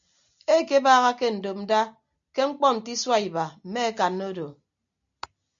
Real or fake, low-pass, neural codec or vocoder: real; 7.2 kHz; none